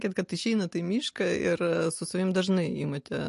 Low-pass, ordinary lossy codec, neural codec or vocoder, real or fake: 14.4 kHz; MP3, 48 kbps; none; real